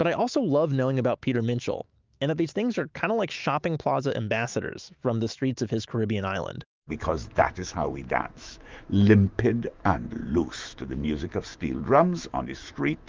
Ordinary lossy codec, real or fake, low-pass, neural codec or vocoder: Opus, 32 kbps; fake; 7.2 kHz; autoencoder, 48 kHz, 128 numbers a frame, DAC-VAE, trained on Japanese speech